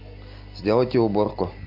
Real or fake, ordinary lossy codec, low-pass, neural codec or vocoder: real; MP3, 48 kbps; 5.4 kHz; none